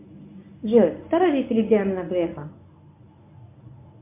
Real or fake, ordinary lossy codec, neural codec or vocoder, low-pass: fake; MP3, 32 kbps; codec, 24 kHz, 0.9 kbps, WavTokenizer, medium speech release version 1; 3.6 kHz